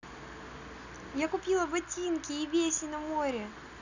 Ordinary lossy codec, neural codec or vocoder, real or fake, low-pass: none; none; real; 7.2 kHz